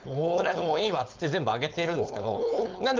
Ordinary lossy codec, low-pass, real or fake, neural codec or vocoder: Opus, 24 kbps; 7.2 kHz; fake; codec, 16 kHz, 4.8 kbps, FACodec